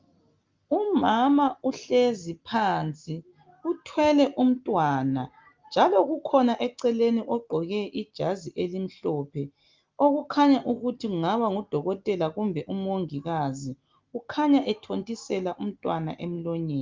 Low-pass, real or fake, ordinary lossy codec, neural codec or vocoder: 7.2 kHz; real; Opus, 32 kbps; none